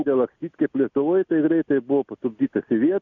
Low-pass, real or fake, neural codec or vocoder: 7.2 kHz; real; none